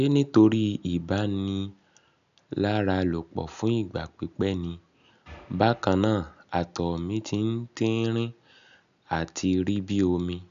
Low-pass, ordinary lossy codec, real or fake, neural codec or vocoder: 7.2 kHz; none; real; none